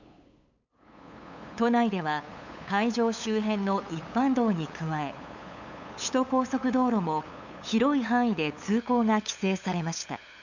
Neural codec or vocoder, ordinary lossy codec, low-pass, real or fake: codec, 16 kHz, 8 kbps, FunCodec, trained on LibriTTS, 25 frames a second; none; 7.2 kHz; fake